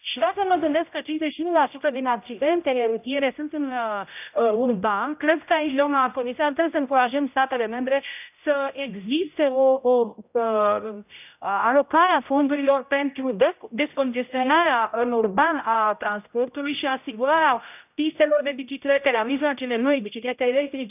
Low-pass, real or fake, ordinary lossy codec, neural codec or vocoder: 3.6 kHz; fake; none; codec, 16 kHz, 0.5 kbps, X-Codec, HuBERT features, trained on general audio